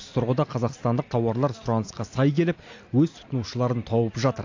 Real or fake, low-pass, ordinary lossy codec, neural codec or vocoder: real; 7.2 kHz; AAC, 48 kbps; none